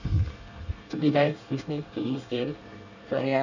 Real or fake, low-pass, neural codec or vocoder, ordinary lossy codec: fake; 7.2 kHz; codec, 24 kHz, 1 kbps, SNAC; none